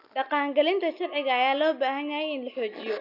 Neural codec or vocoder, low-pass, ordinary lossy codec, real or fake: none; 5.4 kHz; none; real